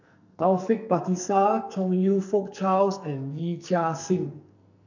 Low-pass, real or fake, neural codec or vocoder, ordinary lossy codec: 7.2 kHz; fake; codec, 44.1 kHz, 2.6 kbps, SNAC; none